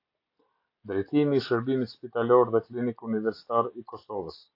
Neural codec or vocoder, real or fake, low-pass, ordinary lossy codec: none; real; 5.4 kHz; AAC, 32 kbps